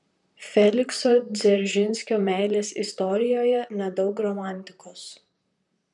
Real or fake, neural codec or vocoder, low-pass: fake; vocoder, 44.1 kHz, 128 mel bands, Pupu-Vocoder; 10.8 kHz